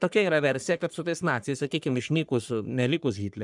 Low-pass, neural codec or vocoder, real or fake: 10.8 kHz; codec, 44.1 kHz, 3.4 kbps, Pupu-Codec; fake